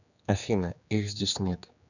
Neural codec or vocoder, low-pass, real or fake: codec, 16 kHz, 2 kbps, X-Codec, HuBERT features, trained on general audio; 7.2 kHz; fake